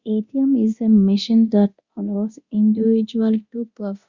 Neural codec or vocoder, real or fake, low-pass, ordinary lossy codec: codec, 24 kHz, 0.9 kbps, DualCodec; fake; 7.2 kHz; Opus, 64 kbps